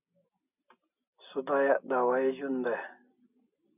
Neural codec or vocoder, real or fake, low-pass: none; real; 3.6 kHz